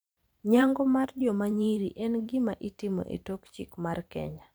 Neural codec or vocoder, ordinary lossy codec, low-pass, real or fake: vocoder, 44.1 kHz, 128 mel bands every 512 samples, BigVGAN v2; none; none; fake